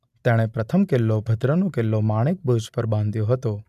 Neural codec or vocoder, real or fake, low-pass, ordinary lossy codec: none; real; 14.4 kHz; none